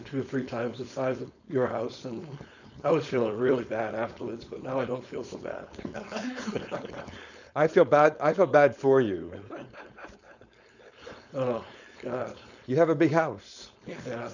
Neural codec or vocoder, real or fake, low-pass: codec, 16 kHz, 4.8 kbps, FACodec; fake; 7.2 kHz